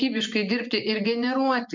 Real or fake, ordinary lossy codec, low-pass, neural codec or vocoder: fake; MP3, 64 kbps; 7.2 kHz; vocoder, 24 kHz, 100 mel bands, Vocos